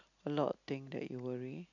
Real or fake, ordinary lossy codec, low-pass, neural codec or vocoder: real; none; 7.2 kHz; none